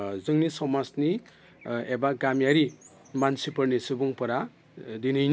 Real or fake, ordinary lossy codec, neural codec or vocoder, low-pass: real; none; none; none